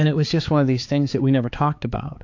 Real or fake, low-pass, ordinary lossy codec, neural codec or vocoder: fake; 7.2 kHz; AAC, 48 kbps; codec, 16 kHz, 4 kbps, X-Codec, HuBERT features, trained on balanced general audio